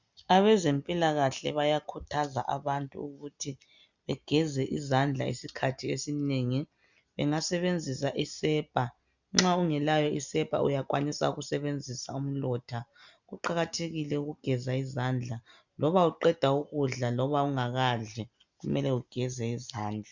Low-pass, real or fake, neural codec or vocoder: 7.2 kHz; real; none